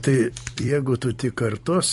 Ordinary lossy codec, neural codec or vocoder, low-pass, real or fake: MP3, 48 kbps; vocoder, 44.1 kHz, 128 mel bands every 256 samples, BigVGAN v2; 14.4 kHz; fake